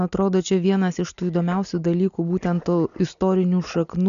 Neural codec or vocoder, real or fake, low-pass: none; real; 7.2 kHz